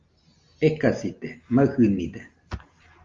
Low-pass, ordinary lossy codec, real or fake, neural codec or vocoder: 7.2 kHz; Opus, 32 kbps; real; none